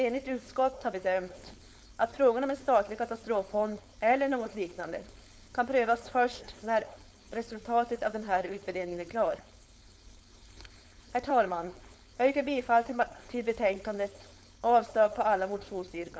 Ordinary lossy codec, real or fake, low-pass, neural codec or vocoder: none; fake; none; codec, 16 kHz, 4.8 kbps, FACodec